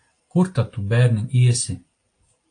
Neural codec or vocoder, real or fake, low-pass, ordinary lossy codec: none; real; 9.9 kHz; AAC, 48 kbps